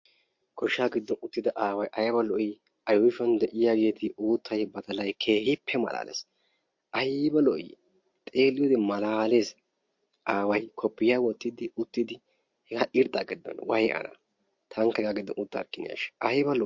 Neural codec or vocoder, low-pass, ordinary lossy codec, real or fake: none; 7.2 kHz; MP3, 48 kbps; real